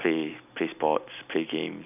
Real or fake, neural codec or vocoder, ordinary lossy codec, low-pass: real; none; none; 3.6 kHz